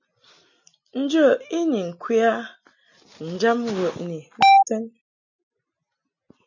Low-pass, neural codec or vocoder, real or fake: 7.2 kHz; none; real